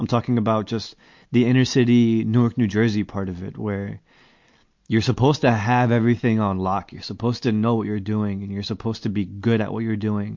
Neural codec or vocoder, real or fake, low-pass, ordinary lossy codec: none; real; 7.2 kHz; MP3, 48 kbps